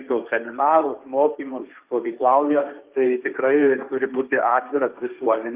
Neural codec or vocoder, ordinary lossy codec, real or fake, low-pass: codec, 16 kHz, 1.1 kbps, Voila-Tokenizer; Opus, 24 kbps; fake; 3.6 kHz